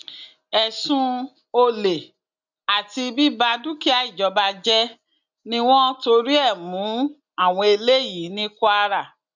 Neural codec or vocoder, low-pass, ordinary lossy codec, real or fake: none; 7.2 kHz; none; real